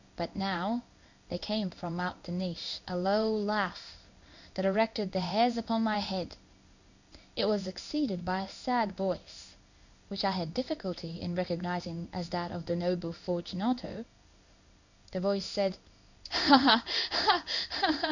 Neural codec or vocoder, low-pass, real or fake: codec, 16 kHz in and 24 kHz out, 1 kbps, XY-Tokenizer; 7.2 kHz; fake